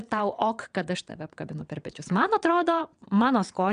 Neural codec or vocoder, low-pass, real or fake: vocoder, 22.05 kHz, 80 mel bands, WaveNeXt; 9.9 kHz; fake